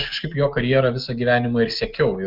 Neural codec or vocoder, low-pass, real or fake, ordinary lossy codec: none; 5.4 kHz; real; Opus, 32 kbps